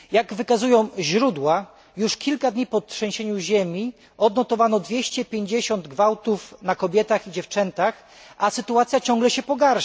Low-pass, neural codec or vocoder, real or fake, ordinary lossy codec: none; none; real; none